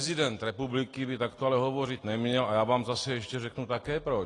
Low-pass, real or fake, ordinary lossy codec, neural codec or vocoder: 10.8 kHz; real; AAC, 32 kbps; none